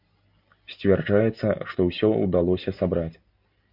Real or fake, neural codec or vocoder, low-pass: real; none; 5.4 kHz